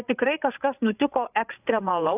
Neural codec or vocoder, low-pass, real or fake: codec, 16 kHz, 8 kbps, FreqCodec, larger model; 3.6 kHz; fake